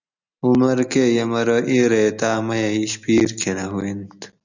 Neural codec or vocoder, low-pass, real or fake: none; 7.2 kHz; real